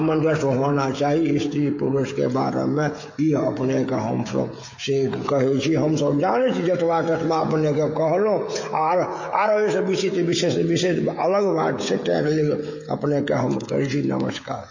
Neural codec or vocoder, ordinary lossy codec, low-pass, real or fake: codec, 16 kHz, 16 kbps, FreqCodec, smaller model; MP3, 32 kbps; 7.2 kHz; fake